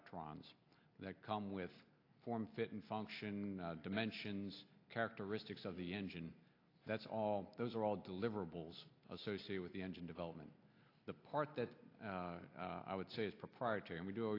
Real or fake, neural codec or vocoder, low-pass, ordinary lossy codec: real; none; 5.4 kHz; AAC, 32 kbps